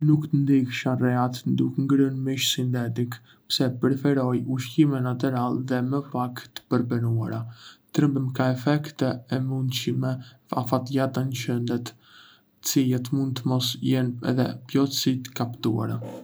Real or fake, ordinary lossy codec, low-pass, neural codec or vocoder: real; none; none; none